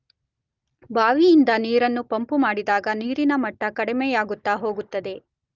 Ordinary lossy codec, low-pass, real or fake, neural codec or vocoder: Opus, 24 kbps; 7.2 kHz; real; none